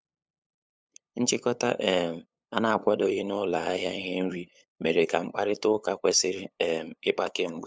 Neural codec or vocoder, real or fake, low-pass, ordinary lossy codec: codec, 16 kHz, 8 kbps, FunCodec, trained on LibriTTS, 25 frames a second; fake; none; none